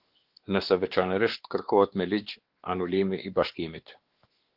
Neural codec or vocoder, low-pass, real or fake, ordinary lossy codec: codec, 16 kHz, 2 kbps, X-Codec, WavLM features, trained on Multilingual LibriSpeech; 5.4 kHz; fake; Opus, 16 kbps